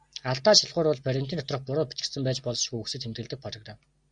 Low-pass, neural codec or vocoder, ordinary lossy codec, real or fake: 9.9 kHz; none; Opus, 64 kbps; real